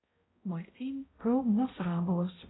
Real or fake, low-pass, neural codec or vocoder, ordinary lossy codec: fake; 7.2 kHz; codec, 16 kHz, 0.5 kbps, X-Codec, HuBERT features, trained on balanced general audio; AAC, 16 kbps